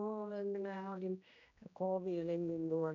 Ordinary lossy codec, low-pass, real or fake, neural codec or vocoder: none; 7.2 kHz; fake; codec, 16 kHz, 1 kbps, X-Codec, HuBERT features, trained on general audio